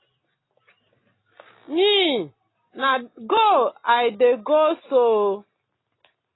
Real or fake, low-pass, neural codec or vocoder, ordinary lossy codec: real; 7.2 kHz; none; AAC, 16 kbps